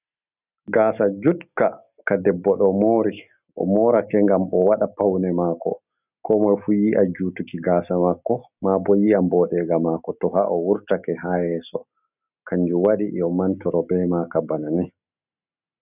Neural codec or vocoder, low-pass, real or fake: none; 3.6 kHz; real